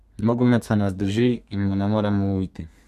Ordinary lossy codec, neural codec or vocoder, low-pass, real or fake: none; codec, 32 kHz, 1.9 kbps, SNAC; 14.4 kHz; fake